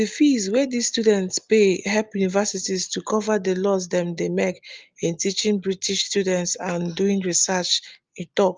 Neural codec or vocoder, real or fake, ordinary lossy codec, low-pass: none; real; Opus, 32 kbps; 7.2 kHz